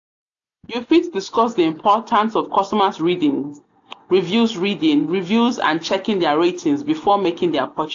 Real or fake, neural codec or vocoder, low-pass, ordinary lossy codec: real; none; 7.2 kHz; AAC, 48 kbps